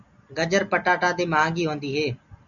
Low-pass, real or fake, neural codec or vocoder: 7.2 kHz; real; none